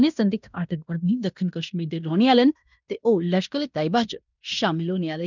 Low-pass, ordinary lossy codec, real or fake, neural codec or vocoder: 7.2 kHz; none; fake; codec, 16 kHz in and 24 kHz out, 0.9 kbps, LongCat-Audio-Codec, fine tuned four codebook decoder